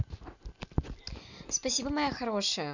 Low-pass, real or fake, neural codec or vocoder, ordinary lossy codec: 7.2 kHz; real; none; none